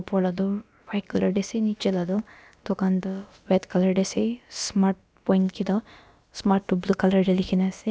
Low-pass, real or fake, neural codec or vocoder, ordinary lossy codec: none; fake; codec, 16 kHz, about 1 kbps, DyCAST, with the encoder's durations; none